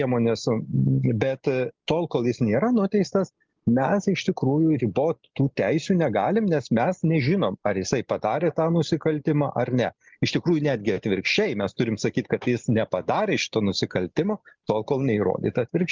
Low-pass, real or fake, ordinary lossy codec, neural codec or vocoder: 7.2 kHz; real; Opus, 32 kbps; none